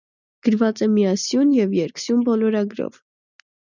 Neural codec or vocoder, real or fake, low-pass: none; real; 7.2 kHz